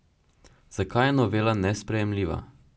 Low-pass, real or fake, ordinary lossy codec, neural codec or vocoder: none; real; none; none